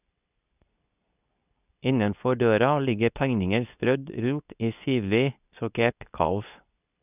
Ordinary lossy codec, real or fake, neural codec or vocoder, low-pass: none; fake; codec, 24 kHz, 0.9 kbps, WavTokenizer, medium speech release version 2; 3.6 kHz